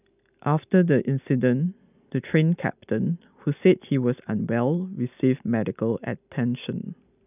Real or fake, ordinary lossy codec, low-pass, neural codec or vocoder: real; none; 3.6 kHz; none